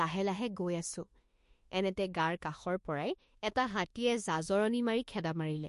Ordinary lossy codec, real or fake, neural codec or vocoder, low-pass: MP3, 48 kbps; fake; autoencoder, 48 kHz, 32 numbers a frame, DAC-VAE, trained on Japanese speech; 14.4 kHz